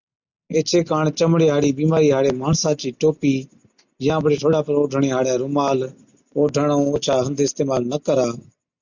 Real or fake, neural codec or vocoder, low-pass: real; none; 7.2 kHz